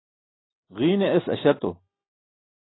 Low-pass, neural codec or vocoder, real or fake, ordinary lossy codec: 7.2 kHz; none; real; AAC, 16 kbps